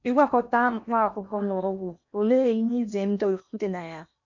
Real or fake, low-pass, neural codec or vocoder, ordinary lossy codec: fake; 7.2 kHz; codec, 16 kHz in and 24 kHz out, 0.8 kbps, FocalCodec, streaming, 65536 codes; none